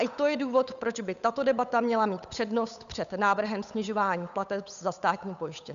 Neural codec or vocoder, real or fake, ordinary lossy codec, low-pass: codec, 16 kHz, 8 kbps, FunCodec, trained on Chinese and English, 25 frames a second; fake; MP3, 96 kbps; 7.2 kHz